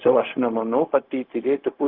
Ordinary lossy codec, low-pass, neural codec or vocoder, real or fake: Opus, 32 kbps; 5.4 kHz; codec, 16 kHz, 0.4 kbps, LongCat-Audio-Codec; fake